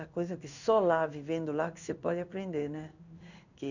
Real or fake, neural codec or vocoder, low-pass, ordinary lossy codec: fake; codec, 16 kHz in and 24 kHz out, 1 kbps, XY-Tokenizer; 7.2 kHz; none